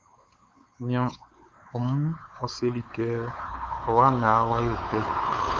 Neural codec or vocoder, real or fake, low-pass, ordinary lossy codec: codec, 16 kHz, 4 kbps, X-Codec, WavLM features, trained on Multilingual LibriSpeech; fake; 7.2 kHz; Opus, 24 kbps